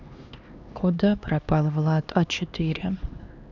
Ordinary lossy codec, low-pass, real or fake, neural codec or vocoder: none; 7.2 kHz; fake; codec, 16 kHz, 2 kbps, X-Codec, HuBERT features, trained on LibriSpeech